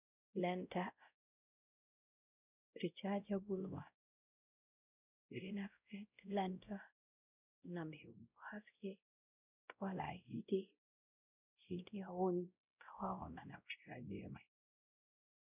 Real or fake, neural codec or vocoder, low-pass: fake; codec, 16 kHz, 0.5 kbps, X-Codec, WavLM features, trained on Multilingual LibriSpeech; 3.6 kHz